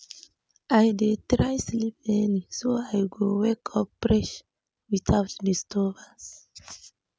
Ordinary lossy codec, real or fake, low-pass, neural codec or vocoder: none; real; none; none